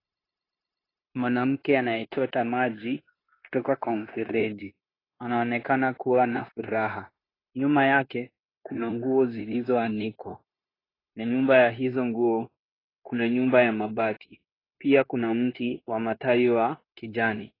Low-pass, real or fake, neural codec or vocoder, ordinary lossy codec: 5.4 kHz; fake; codec, 16 kHz, 0.9 kbps, LongCat-Audio-Codec; AAC, 24 kbps